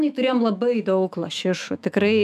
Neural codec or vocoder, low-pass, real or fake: autoencoder, 48 kHz, 128 numbers a frame, DAC-VAE, trained on Japanese speech; 14.4 kHz; fake